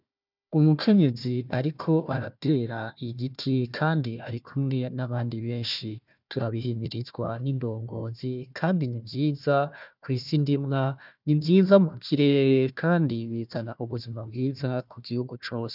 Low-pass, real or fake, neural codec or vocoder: 5.4 kHz; fake; codec, 16 kHz, 1 kbps, FunCodec, trained on Chinese and English, 50 frames a second